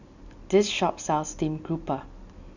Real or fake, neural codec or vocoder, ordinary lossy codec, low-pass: real; none; none; 7.2 kHz